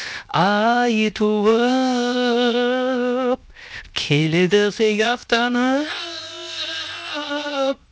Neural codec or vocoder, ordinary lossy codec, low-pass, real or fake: codec, 16 kHz, 0.7 kbps, FocalCodec; none; none; fake